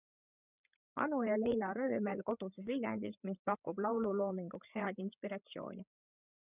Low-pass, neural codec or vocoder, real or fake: 3.6 kHz; codec, 16 kHz, 8 kbps, FreqCodec, larger model; fake